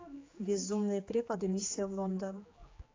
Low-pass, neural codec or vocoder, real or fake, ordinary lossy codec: 7.2 kHz; codec, 16 kHz, 4 kbps, X-Codec, HuBERT features, trained on general audio; fake; AAC, 32 kbps